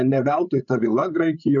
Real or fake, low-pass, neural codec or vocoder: fake; 7.2 kHz; codec, 16 kHz, 8 kbps, FreqCodec, larger model